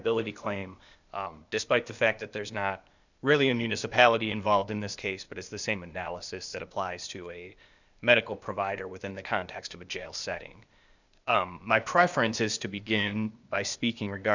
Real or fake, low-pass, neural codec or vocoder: fake; 7.2 kHz; codec, 16 kHz, 0.8 kbps, ZipCodec